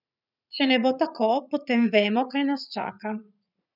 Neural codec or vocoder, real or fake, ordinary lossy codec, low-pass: vocoder, 44.1 kHz, 80 mel bands, Vocos; fake; none; 5.4 kHz